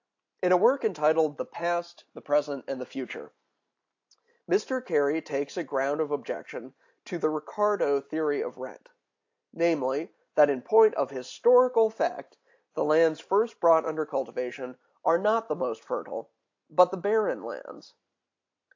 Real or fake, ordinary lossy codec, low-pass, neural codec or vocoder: real; AAC, 48 kbps; 7.2 kHz; none